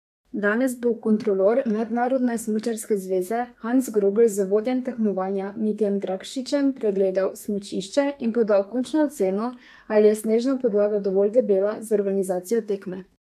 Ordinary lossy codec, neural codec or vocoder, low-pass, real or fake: MP3, 96 kbps; codec, 32 kHz, 1.9 kbps, SNAC; 14.4 kHz; fake